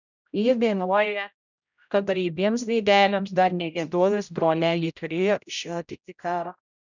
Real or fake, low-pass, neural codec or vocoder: fake; 7.2 kHz; codec, 16 kHz, 0.5 kbps, X-Codec, HuBERT features, trained on general audio